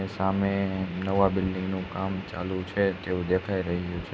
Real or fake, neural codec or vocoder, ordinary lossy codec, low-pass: real; none; none; none